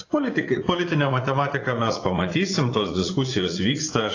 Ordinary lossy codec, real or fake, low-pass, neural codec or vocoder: AAC, 32 kbps; fake; 7.2 kHz; vocoder, 44.1 kHz, 80 mel bands, Vocos